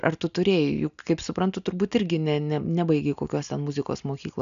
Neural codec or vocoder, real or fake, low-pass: none; real; 7.2 kHz